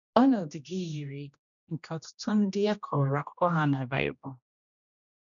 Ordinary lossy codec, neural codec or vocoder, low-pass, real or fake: none; codec, 16 kHz, 1 kbps, X-Codec, HuBERT features, trained on general audio; 7.2 kHz; fake